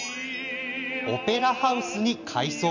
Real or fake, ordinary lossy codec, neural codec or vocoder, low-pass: real; none; none; 7.2 kHz